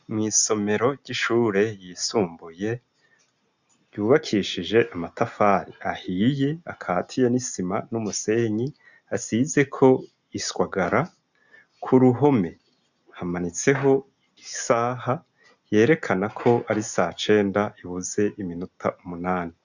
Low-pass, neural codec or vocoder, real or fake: 7.2 kHz; none; real